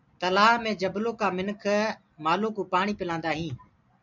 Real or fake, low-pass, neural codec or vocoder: real; 7.2 kHz; none